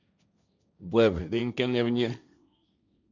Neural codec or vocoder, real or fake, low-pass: codec, 16 kHz, 1.1 kbps, Voila-Tokenizer; fake; 7.2 kHz